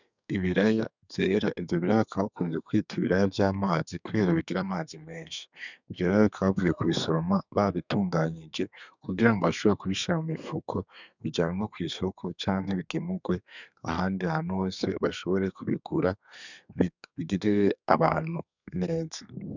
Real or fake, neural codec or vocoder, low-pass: fake; codec, 32 kHz, 1.9 kbps, SNAC; 7.2 kHz